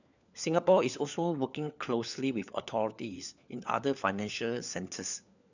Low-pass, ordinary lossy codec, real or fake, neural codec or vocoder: 7.2 kHz; none; fake; codec, 16 kHz, 4 kbps, FunCodec, trained on LibriTTS, 50 frames a second